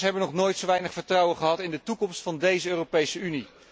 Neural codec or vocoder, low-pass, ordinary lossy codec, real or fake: none; none; none; real